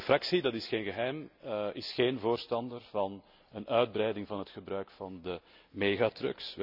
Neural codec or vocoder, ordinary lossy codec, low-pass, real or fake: none; none; 5.4 kHz; real